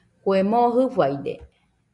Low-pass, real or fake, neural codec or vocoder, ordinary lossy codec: 10.8 kHz; real; none; Opus, 64 kbps